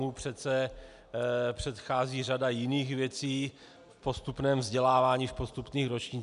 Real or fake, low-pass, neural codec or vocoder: real; 10.8 kHz; none